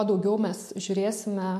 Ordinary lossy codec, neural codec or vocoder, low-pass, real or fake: MP3, 64 kbps; none; 14.4 kHz; real